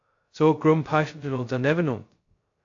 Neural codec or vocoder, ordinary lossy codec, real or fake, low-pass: codec, 16 kHz, 0.2 kbps, FocalCodec; AAC, 48 kbps; fake; 7.2 kHz